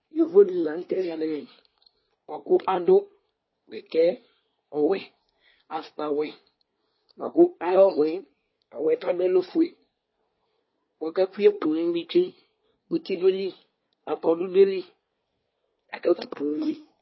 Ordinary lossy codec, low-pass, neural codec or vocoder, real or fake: MP3, 24 kbps; 7.2 kHz; codec, 24 kHz, 1 kbps, SNAC; fake